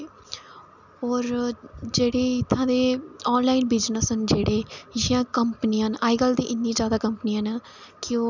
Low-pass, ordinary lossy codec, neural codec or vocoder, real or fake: 7.2 kHz; none; none; real